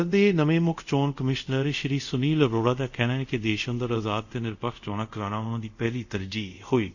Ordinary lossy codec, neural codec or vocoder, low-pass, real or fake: none; codec, 24 kHz, 0.5 kbps, DualCodec; 7.2 kHz; fake